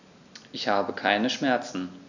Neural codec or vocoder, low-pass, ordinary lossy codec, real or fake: none; 7.2 kHz; none; real